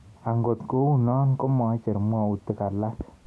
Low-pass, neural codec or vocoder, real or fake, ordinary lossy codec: none; none; real; none